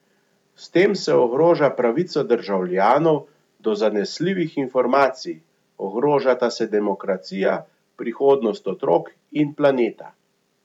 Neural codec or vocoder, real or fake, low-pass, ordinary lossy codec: none; real; 19.8 kHz; none